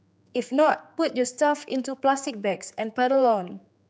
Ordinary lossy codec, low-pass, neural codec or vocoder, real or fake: none; none; codec, 16 kHz, 4 kbps, X-Codec, HuBERT features, trained on general audio; fake